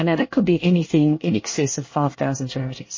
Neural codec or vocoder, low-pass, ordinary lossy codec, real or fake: codec, 24 kHz, 1 kbps, SNAC; 7.2 kHz; MP3, 32 kbps; fake